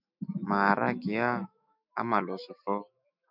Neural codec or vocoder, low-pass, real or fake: autoencoder, 48 kHz, 128 numbers a frame, DAC-VAE, trained on Japanese speech; 5.4 kHz; fake